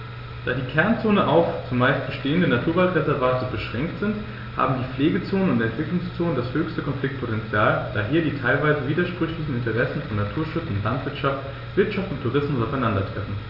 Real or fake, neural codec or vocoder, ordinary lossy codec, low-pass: real; none; none; 5.4 kHz